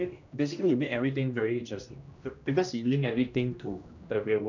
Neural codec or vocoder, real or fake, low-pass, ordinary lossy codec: codec, 16 kHz, 1 kbps, X-Codec, HuBERT features, trained on general audio; fake; 7.2 kHz; none